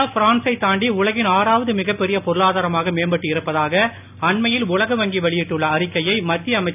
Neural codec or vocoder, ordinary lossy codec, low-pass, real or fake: none; none; 3.6 kHz; real